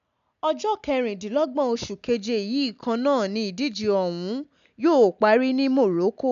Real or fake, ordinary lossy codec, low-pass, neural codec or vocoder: real; MP3, 96 kbps; 7.2 kHz; none